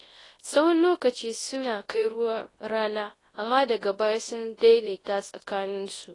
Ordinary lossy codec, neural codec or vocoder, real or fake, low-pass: AAC, 32 kbps; codec, 24 kHz, 0.9 kbps, WavTokenizer, large speech release; fake; 10.8 kHz